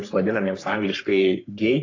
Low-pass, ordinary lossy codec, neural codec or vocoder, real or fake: 7.2 kHz; AAC, 32 kbps; codec, 44.1 kHz, 3.4 kbps, Pupu-Codec; fake